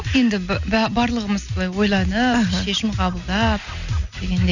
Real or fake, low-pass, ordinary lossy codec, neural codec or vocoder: real; 7.2 kHz; none; none